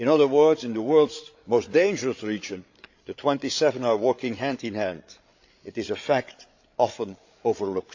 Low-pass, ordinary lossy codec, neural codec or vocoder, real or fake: 7.2 kHz; AAC, 48 kbps; codec, 16 kHz, 8 kbps, FreqCodec, larger model; fake